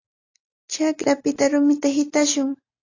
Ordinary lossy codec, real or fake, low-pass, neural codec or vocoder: AAC, 32 kbps; real; 7.2 kHz; none